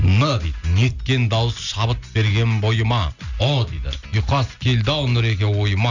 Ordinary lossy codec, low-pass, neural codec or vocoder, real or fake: none; 7.2 kHz; none; real